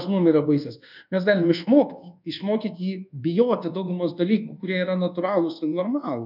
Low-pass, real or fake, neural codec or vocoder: 5.4 kHz; fake; codec, 24 kHz, 1.2 kbps, DualCodec